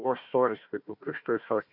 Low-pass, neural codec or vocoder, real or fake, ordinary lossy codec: 5.4 kHz; codec, 16 kHz, 1 kbps, FunCodec, trained on Chinese and English, 50 frames a second; fake; MP3, 48 kbps